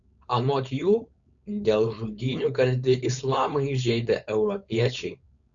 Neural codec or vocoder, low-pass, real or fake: codec, 16 kHz, 4.8 kbps, FACodec; 7.2 kHz; fake